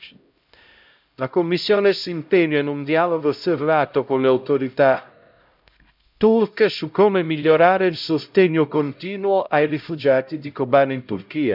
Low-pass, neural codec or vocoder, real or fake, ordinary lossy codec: 5.4 kHz; codec, 16 kHz, 0.5 kbps, X-Codec, HuBERT features, trained on LibriSpeech; fake; none